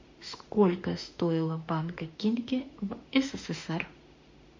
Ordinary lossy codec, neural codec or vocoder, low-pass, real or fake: MP3, 64 kbps; autoencoder, 48 kHz, 32 numbers a frame, DAC-VAE, trained on Japanese speech; 7.2 kHz; fake